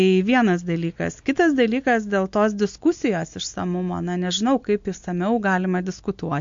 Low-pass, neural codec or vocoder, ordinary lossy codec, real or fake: 7.2 kHz; none; MP3, 48 kbps; real